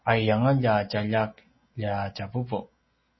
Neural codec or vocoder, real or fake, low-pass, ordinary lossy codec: none; real; 7.2 kHz; MP3, 24 kbps